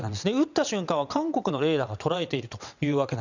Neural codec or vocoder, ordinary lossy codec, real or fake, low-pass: vocoder, 22.05 kHz, 80 mel bands, WaveNeXt; none; fake; 7.2 kHz